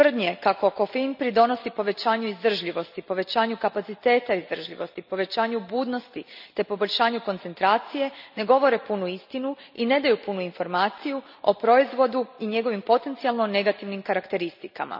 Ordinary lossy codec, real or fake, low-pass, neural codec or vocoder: none; real; 5.4 kHz; none